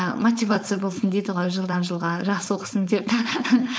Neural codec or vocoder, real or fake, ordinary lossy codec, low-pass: codec, 16 kHz, 4.8 kbps, FACodec; fake; none; none